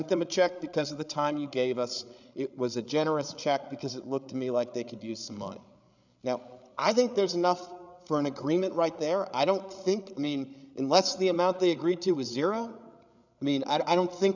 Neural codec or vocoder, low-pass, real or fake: codec, 16 kHz, 8 kbps, FreqCodec, larger model; 7.2 kHz; fake